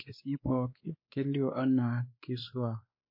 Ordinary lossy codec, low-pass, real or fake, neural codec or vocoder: MP3, 32 kbps; 5.4 kHz; fake; codec, 16 kHz, 2 kbps, X-Codec, WavLM features, trained on Multilingual LibriSpeech